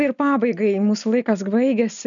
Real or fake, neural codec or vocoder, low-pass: real; none; 7.2 kHz